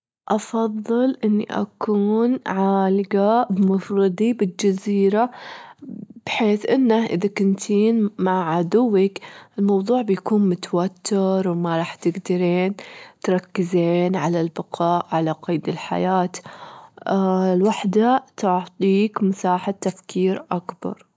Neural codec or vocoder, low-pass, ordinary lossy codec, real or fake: none; none; none; real